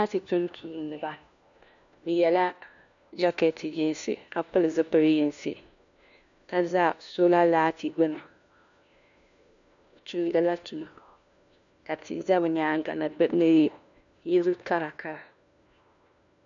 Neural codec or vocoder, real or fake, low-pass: codec, 16 kHz, 1 kbps, FunCodec, trained on LibriTTS, 50 frames a second; fake; 7.2 kHz